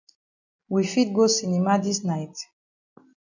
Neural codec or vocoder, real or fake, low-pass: none; real; 7.2 kHz